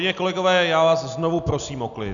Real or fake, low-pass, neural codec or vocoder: real; 7.2 kHz; none